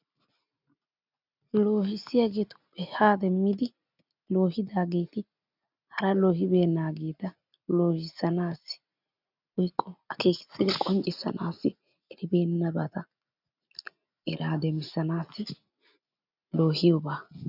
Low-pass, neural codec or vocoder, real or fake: 5.4 kHz; none; real